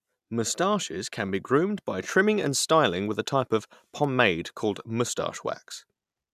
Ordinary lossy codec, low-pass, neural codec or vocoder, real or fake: none; 14.4 kHz; none; real